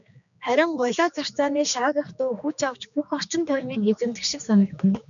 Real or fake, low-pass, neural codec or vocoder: fake; 7.2 kHz; codec, 16 kHz, 2 kbps, X-Codec, HuBERT features, trained on general audio